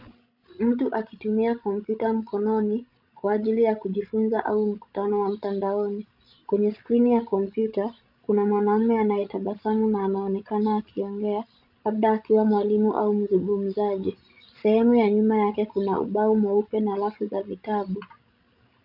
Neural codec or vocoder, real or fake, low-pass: codec, 16 kHz, 16 kbps, FreqCodec, larger model; fake; 5.4 kHz